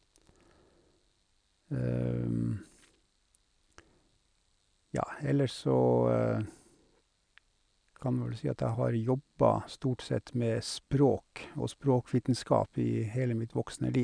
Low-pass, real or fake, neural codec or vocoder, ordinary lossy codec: 9.9 kHz; real; none; none